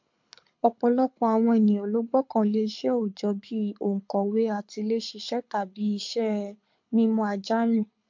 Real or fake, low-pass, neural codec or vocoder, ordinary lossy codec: fake; 7.2 kHz; codec, 24 kHz, 6 kbps, HILCodec; MP3, 48 kbps